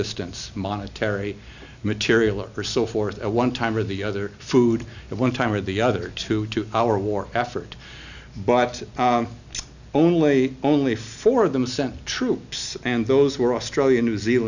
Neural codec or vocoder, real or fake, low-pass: none; real; 7.2 kHz